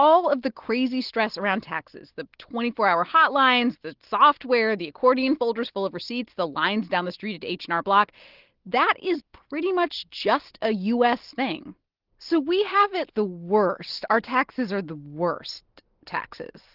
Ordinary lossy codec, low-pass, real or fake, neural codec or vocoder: Opus, 16 kbps; 5.4 kHz; real; none